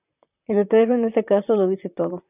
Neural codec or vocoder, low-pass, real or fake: vocoder, 44.1 kHz, 128 mel bands, Pupu-Vocoder; 3.6 kHz; fake